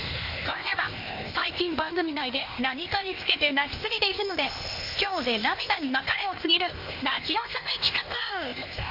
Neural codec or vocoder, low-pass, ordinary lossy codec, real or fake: codec, 16 kHz, 0.8 kbps, ZipCodec; 5.4 kHz; MP3, 32 kbps; fake